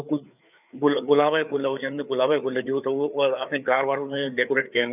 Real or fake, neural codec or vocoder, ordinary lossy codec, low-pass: fake; codec, 16 kHz, 4 kbps, FreqCodec, larger model; none; 3.6 kHz